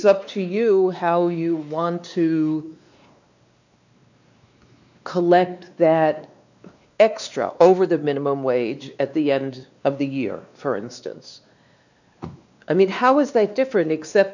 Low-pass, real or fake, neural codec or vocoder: 7.2 kHz; fake; codec, 16 kHz, 2 kbps, X-Codec, WavLM features, trained on Multilingual LibriSpeech